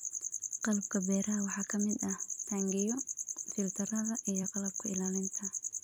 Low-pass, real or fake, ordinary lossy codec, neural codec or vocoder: none; fake; none; vocoder, 44.1 kHz, 128 mel bands every 256 samples, BigVGAN v2